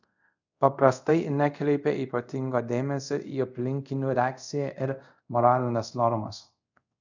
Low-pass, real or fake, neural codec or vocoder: 7.2 kHz; fake; codec, 24 kHz, 0.5 kbps, DualCodec